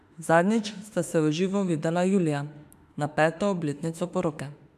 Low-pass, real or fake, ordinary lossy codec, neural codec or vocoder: 14.4 kHz; fake; none; autoencoder, 48 kHz, 32 numbers a frame, DAC-VAE, trained on Japanese speech